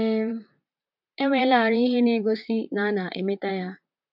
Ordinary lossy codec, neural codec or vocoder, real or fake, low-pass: MP3, 48 kbps; vocoder, 44.1 kHz, 128 mel bands, Pupu-Vocoder; fake; 5.4 kHz